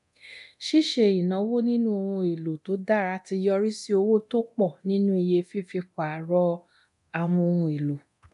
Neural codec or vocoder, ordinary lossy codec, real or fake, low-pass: codec, 24 kHz, 0.9 kbps, DualCodec; none; fake; 10.8 kHz